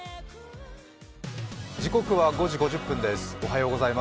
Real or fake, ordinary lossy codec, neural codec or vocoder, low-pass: real; none; none; none